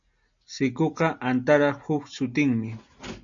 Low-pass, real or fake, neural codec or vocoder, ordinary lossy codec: 7.2 kHz; real; none; MP3, 64 kbps